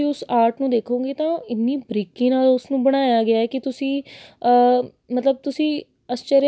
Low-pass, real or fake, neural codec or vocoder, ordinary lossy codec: none; real; none; none